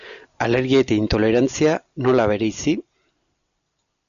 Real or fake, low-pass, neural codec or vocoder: real; 7.2 kHz; none